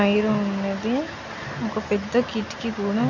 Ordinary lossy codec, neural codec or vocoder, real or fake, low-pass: none; none; real; 7.2 kHz